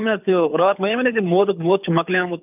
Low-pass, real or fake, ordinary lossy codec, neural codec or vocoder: 3.6 kHz; fake; none; codec, 24 kHz, 6 kbps, HILCodec